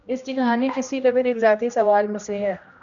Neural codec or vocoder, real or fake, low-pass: codec, 16 kHz, 1 kbps, X-Codec, HuBERT features, trained on general audio; fake; 7.2 kHz